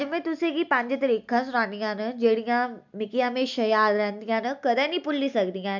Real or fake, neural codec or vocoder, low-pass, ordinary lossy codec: real; none; 7.2 kHz; none